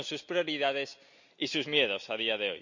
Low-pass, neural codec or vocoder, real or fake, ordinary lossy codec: 7.2 kHz; none; real; none